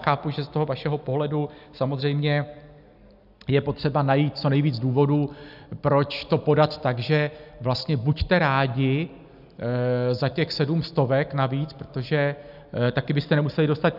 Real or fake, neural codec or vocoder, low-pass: real; none; 5.4 kHz